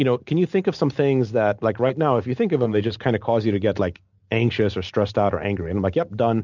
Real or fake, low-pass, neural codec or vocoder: real; 7.2 kHz; none